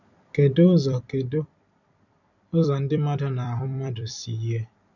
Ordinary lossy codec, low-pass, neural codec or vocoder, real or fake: none; 7.2 kHz; vocoder, 44.1 kHz, 128 mel bands every 256 samples, BigVGAN v2; fake